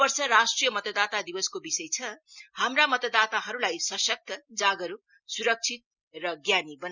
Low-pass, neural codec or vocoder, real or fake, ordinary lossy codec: 7.2 kHz; none; real; Opus, 64 kbps